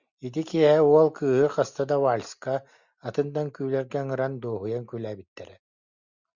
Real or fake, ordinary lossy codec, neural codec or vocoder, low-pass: real; Opus, 64 kbps; none; 7.2 kHz